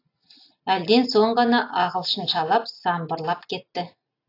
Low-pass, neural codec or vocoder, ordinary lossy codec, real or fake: 5.4 kHz; none; AAC, 32 kbps; real